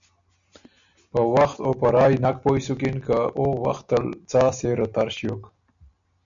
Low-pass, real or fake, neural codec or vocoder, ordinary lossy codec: 7.2 kHz; real; none; MP3, 96 kbps